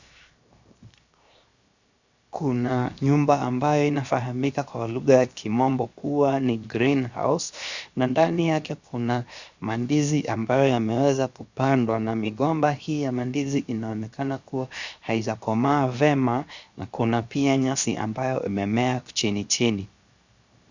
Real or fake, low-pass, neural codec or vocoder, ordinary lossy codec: fake; 7.2 kHz; codec, 16 kHz, 0.7 kbps, FocalCodec; Opus, 64 kbps